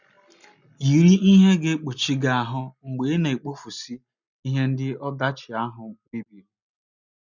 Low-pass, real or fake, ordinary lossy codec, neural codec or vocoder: 7.2 kHz; real; none; none